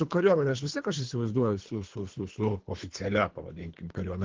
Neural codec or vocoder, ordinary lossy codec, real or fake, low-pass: codec, 24 kHz, 3 kbps, HILCodec; Opus, 16 kbps; fake; 7.2 kHz